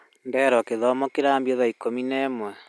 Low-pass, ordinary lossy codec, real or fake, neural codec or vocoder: none; none; real; none